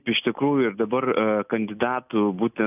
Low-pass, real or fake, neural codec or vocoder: 3.6 kHz; real; none